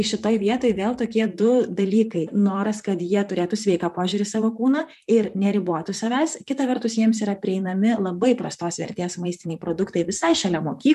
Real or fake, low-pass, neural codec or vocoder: fake; 14.4 kHz; vocoder, 44.1 kHz, 128 mel bands, Pupu-Vocoder